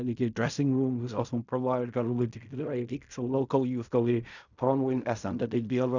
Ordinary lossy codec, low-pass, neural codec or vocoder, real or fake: none; 7.2 kHz; codec, 16 kHz in and 24 kHz out, 0.4 kbps, LongCat-Audio-Codec, fine tuned four codebook decoder; fake